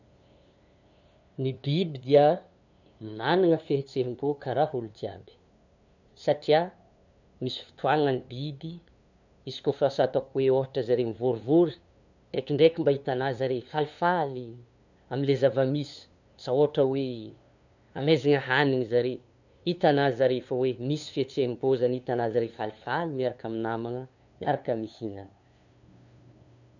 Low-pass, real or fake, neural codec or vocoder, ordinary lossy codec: 7.2 kHz; fake; codec, 16 kHz, 2 kbps, FunCodec, trained on LibriTTS, 25 frames a second; none